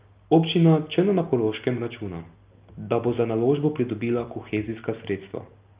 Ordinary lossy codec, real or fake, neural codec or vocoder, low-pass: Opus, 24 kbps; real; none; 3.6 kHz